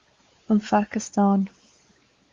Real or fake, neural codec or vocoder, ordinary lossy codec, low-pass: fake; codec, 16 kHz, 4 kbps, X-Codec, WavLM features, trained on Multilingual LibriSpeech; Opus, 32 kbps; 7.2 kHz